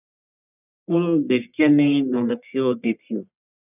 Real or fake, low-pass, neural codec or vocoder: fake; 3.6 kHz; codec, 44.1 kHz, 1.7 kbps, Pupu-Codec